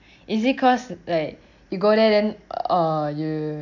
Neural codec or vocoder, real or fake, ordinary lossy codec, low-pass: none; real; none; 7.2 kHz